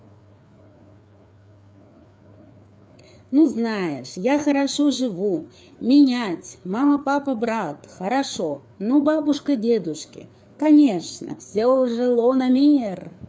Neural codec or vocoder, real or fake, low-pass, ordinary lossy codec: codec, 16 kHz, 4 kbps, FreqCodec, larger model; fake; none; none